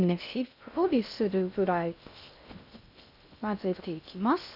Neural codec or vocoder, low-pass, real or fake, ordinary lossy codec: codec, 16 kHz in and 24 kHz out, 0.6 kbps, FocalCodec, streaming, 2048 codes; 5.4 kHz; fake; none